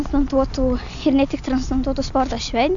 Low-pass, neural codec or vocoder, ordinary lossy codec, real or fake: 7.2 kHz; none; AAC, 64 kbps; real